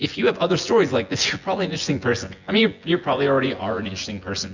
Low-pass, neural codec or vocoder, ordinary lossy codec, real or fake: 7.2 kHz; vocoder, 24 kHz, 100 mel bands, Vocos; Opus, 64 kbps; fake